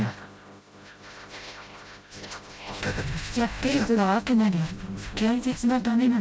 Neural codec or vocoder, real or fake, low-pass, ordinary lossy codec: codec, 16 kHz, 0.5 kbps, FreqCodec, smaller model; fake; none; none